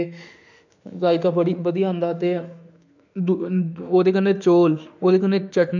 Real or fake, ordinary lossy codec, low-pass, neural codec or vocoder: fake; none; 7.2 kHz; autoencoder, 48 kHz, 32 numbers a frame, DAC-VAE, trained on Japanese speech